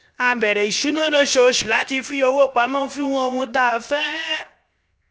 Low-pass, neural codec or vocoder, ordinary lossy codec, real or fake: none; codec, 16 kHz, 0.7 kbps, FocalCodec; none; fake